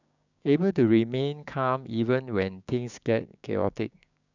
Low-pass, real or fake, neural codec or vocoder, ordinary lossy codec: 7.2 kHz; fake; codec, 16 kHz, 6 kbps, DAC; none